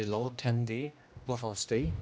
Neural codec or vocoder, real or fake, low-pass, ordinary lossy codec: codec, 16 kHz, 1 kbps, X-Codec, HuBERT features, trained on general audio; fake; none; none